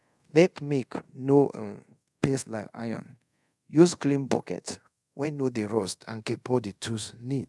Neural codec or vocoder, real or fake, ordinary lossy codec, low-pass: codec, 24 kHz, 0.5 kbps, DualCodec; fake; none; none